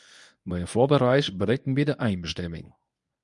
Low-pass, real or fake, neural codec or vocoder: 10.8 kHz; fake; codec, 24 kHz, 0.9 kbps, WavTokenizer, medium speech release version 1